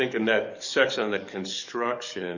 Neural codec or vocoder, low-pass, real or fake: codec, 44.1 kHz, 7.8 kbps, DAC; 7.2 kHz; fake